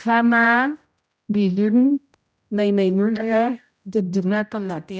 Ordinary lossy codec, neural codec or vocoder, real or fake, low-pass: none; codec, 16 kHz, 0.5 kbps, X-Codec, HuBERT features, trained on general audio; fake; none